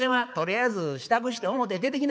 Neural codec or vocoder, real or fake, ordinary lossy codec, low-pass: codec, 16 kHz, 4 kbps, X-Codec, HuBERT features, trained on balanced general audio; fake; none; none